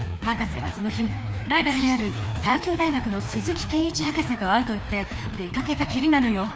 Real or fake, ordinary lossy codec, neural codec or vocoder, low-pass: fake; none; codec, 16 kHz, 2 kbps, FreqCodec, larger model; none